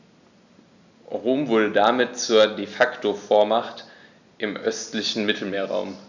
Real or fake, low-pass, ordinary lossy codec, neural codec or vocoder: real; 7.2 kHz; none; none